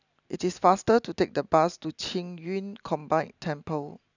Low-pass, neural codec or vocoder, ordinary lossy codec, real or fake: 7.2 kHz; none; none; real